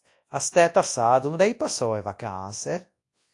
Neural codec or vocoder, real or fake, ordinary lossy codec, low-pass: codec, 24 kHz, 0.9 kbps, WavTokenizer, large speech release; fake; AAC, 48 kbps; 10.8 kHz